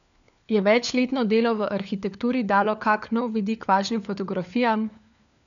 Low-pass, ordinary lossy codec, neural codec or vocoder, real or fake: 7.2 kHz; none; codec, 16 kHz, 4 kbps, FunCodec, trained on LibriTTS, 50 frames a second; fake